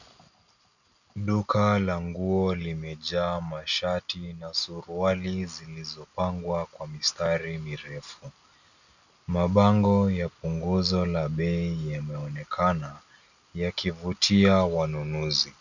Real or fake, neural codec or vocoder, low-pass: real; none; 7.2 kHz